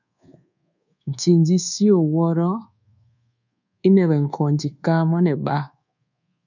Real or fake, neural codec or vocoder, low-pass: fake; codec, 24 kHz, 1.2 kbps, DualCodec; 7.2 kHz